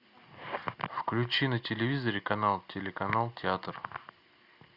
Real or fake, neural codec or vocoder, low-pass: real; none; 5.4 kHz